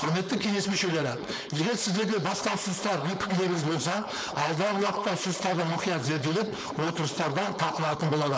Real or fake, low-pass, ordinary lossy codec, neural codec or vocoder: fake; none; none; codec, 16 kHz, 4.8 kbps, FACodec